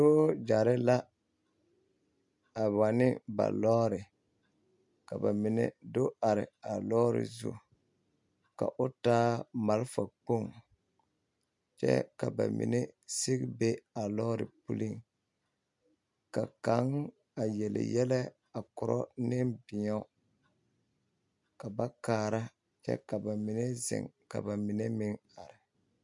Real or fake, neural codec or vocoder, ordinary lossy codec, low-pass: real; none; MP3, 64 kbps; 10.8 kHz